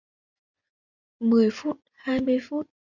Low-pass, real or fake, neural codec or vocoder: 7.2 kHz; fake; vocoder, 24 kHz, 100 mel bands, Vocos